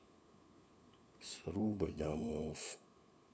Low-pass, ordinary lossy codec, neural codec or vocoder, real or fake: none; none; codec, 16 kHz, 4 kbps, FunCodec, trained on LibriTTS, 50 frames a second; fake